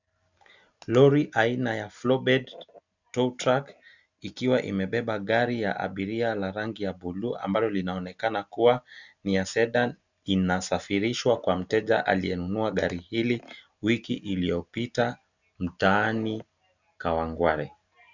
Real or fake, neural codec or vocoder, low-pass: real; none; 7.2 kHz